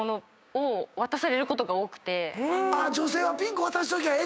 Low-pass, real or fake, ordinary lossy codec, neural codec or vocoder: none; fake; none; codec, 16 kHz, 6 kbps, DAC